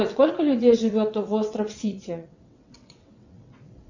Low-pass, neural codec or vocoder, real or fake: 7.2 kHz; vocoder, 22.05 kHz, 80 mel bands, WaveNeXt; fake